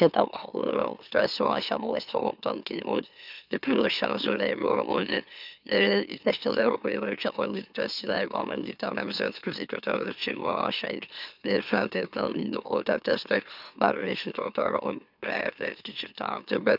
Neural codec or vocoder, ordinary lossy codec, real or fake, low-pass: autoencoder, 44.1 kHz, a latent of 192 numbers a frame, MeloTTS; none; fake; 5.4 kHz